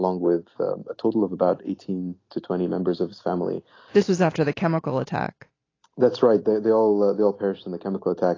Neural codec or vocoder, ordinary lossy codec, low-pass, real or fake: none; AAC, 32 kbps; 7.2 kHz; real